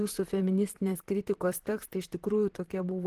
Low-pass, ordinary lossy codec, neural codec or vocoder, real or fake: 14.4 kHz; Opus, 16 kbps; vocoder, 44.1 kHz, 128 mel bands, Pupu-Vocoder; fake